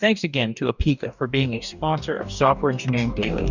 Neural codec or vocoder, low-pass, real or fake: codec, 44.1 kHz, 2.6 kbps, DAC; 7.2 kHz; fake